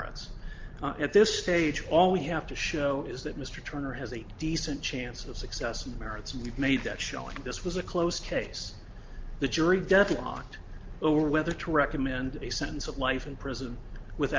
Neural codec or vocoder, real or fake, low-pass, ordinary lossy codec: none; real; 7.2 kHz; Opus, 24 kbps